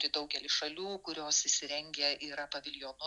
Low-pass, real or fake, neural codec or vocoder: 10.8 kHz; real; none